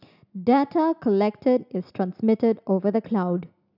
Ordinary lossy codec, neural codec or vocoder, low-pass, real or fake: none; none; 5.4 kHz; real